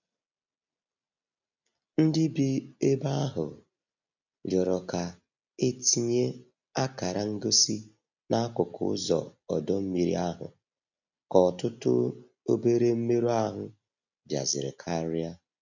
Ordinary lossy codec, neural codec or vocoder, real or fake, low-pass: none; none; real; 7.2 kHz